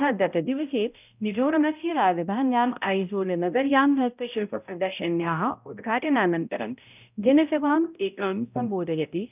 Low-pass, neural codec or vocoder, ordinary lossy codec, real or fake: 3.6 kHz; codec, 16 kHz, 0.5 kbps, X-Codec, HuBERT features, trained on balanced general audio; none; fake